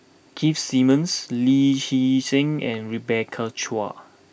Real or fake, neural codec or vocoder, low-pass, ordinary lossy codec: real; none; none; none